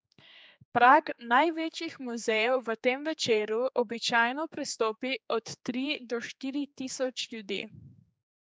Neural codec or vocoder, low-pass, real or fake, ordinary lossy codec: codec, 16 kHz, 4 kbps, X-Codec, HuBERT features, trained on general audio; none; fake; none